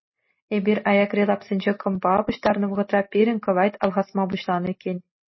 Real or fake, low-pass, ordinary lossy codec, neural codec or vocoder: real; 7.2 kHz; MP3, 24 kbps; none